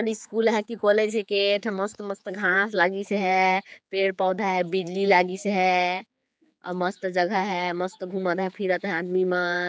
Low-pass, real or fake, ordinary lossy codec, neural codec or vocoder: none; fake; none; codec, 16 kHz, 4 kbps, X-Codec, HuBERT features, trained on general audio